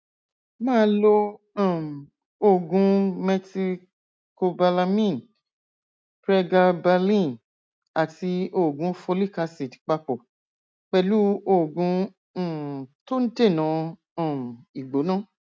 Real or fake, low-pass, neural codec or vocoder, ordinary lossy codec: real; none; none; none